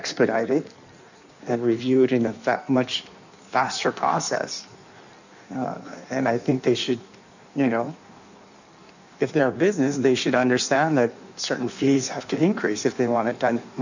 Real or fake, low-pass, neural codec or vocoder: fake; 7.2 kHz; codec, 16 kHz in and 24 kHz out, 1.1 kbps, FireRedTTS-2 codec